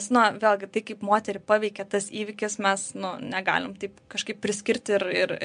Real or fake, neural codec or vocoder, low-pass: real; none; 9.9 kHz